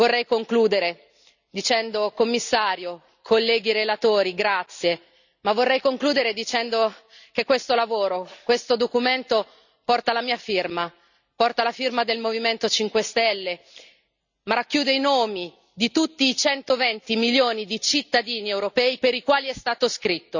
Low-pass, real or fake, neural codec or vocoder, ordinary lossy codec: 7.2 kHz; real; none; none